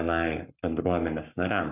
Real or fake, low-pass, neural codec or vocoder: fake; 3.6 kHz; codec, 44.1 kHz, 7.8 kbps, Pupu-Codec